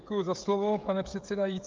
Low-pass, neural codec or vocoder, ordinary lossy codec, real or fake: 7.2 kHz; codec, 16 kHz, 16 kbps, FreqCodec, smaller model; Opus, 24 kbps; fake